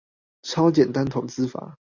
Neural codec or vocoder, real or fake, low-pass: none; real; 7.2 kHz